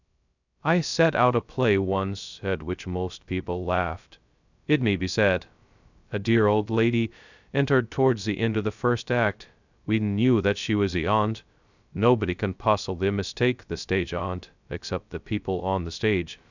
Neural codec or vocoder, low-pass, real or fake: codec, 16 kHz, 0.2 kbps, FocalCodec; 7.2 kHz; fake